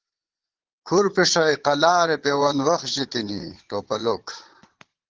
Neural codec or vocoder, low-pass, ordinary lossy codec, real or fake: vocoder, 22.05 kHz, 80 mel bands, Vocos; 7.2 kHz; Opus, 16 kbps; fake